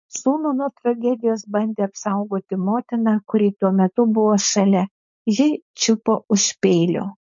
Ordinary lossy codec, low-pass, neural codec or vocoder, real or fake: MP3, 48 kbps; 7.2 kHz; codec, 16 kHz, 4.8 kbps, FACodec; fake